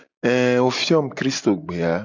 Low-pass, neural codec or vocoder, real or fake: 7.2 kHz; none; real